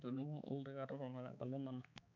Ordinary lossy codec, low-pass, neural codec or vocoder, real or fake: none; 7.2 kHz; codec, 16 kHz, 4 kbps, X-Codec, HuBERT features, trained on balanced general audio; fake